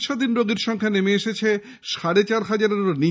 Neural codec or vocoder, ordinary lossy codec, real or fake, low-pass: none; none; real; none